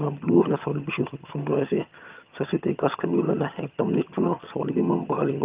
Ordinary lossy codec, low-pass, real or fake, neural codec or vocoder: Opus, 32 kbps; 3.6 kHz; fake; vocoder, 22.05 kHz, 80 mel bands, HiFi-GAN